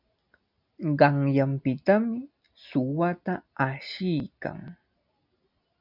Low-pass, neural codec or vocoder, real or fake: 5.4 kHz; none; real